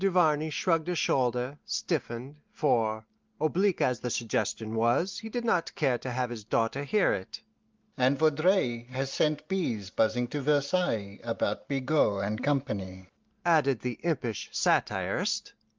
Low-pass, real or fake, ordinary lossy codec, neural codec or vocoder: 7.2 kHz; real; Opus, 32 kbps; none